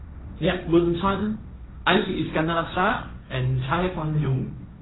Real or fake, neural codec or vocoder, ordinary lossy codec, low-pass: fake; codec, 16 kHz, 1.1 kbps, Voila-Tokenizer; AAC, 16 kbps; 7.2 kHz